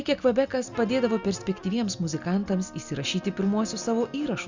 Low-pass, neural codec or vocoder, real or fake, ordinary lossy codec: 7.2 kHz; none; real; Opus, 64 kbps